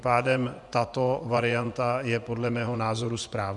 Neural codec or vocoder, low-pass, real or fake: vocoder, 24 kHz, 100 mel bands, Vocos; 10.8 kHz; fake